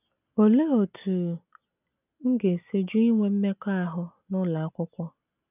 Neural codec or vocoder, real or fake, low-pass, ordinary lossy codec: none; real; 3.6 kHz; none